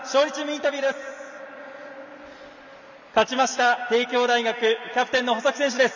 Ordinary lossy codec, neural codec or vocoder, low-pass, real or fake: none; none; 7.2 kHz; real